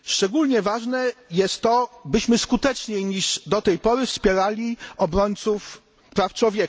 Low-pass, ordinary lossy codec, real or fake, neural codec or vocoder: none; none; real; none